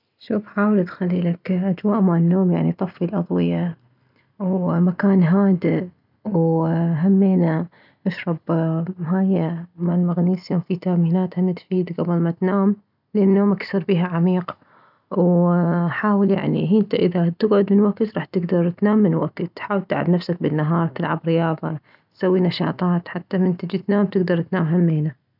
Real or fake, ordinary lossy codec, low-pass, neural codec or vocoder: real; none; 5.4 kHz; none